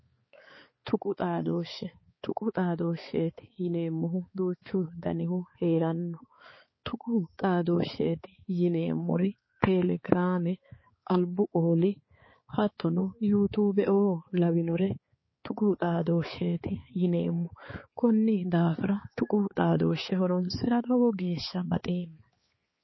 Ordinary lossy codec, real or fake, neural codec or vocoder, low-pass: MP3, 24 kbps; fake; codec, 16 kHz, 4 kbps, X-Codec, HuBERT features, trained on balanced general audio; 7.2 kHz